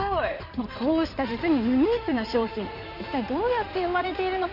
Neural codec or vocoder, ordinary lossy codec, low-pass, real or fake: codec, 16 kHz in and 24 kHz out, 2.2 kbps, FireRedTTS-2 codec; none; 5.4 kHz; fake